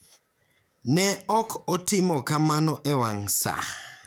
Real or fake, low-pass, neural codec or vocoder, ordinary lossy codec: fake; none; codec, 44.1 kHz, 7.8 kbps, DAC; none